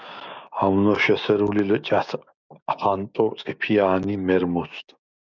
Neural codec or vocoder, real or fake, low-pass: codec, 16 kHz, 6 kbps, DAC; fake; 7.2 kHz